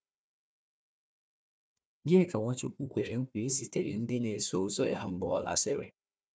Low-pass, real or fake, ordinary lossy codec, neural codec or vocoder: none; fake; none; codec, 16 kHz, 1 kbps, FunCodec, trained on Chinese and English, 50 frames a second